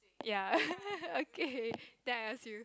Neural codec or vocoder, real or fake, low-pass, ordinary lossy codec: none; real; none; none